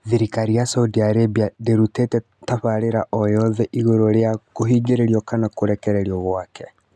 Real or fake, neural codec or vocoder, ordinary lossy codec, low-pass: real; none; none; none